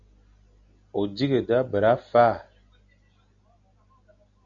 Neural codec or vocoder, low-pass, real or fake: none; 7.2 kHz; real